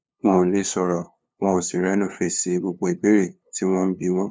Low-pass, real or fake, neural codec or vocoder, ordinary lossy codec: none; fake; codec, 16 kHz, 2 kbps, FunCodec, trained on LibriTTS, 25 frames a second; none